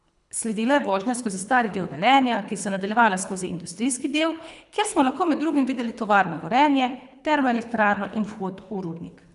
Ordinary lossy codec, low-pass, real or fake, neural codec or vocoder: none; 10.8 kHz; fake; codec, 24 kHz, 3 kbps, HILCodec